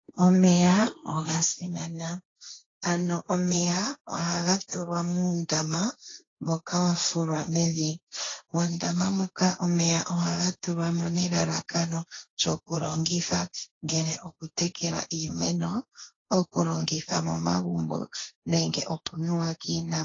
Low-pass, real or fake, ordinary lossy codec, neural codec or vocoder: 7.2 kHz; fake; AAC, 32 kbps; codec, 16 kHz, 1.1 kbps, Voila-Tokenizer